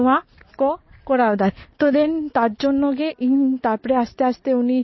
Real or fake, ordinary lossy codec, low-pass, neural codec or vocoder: real; MP3, 24 kbps; 7.2 kHz; none